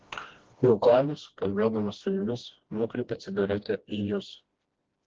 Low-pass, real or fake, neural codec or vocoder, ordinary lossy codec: 7.2 kHz; fake; codec, 16 kHz, 1 kbps, FreqCodec, smaller model; Opus, 16 kbps